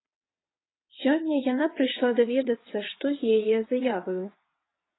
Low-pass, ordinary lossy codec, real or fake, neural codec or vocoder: 7.2 kHz; AAC, 16 kbps; fake; vocoder, 22.05 kHz, 80 mel bands, WaveNeXt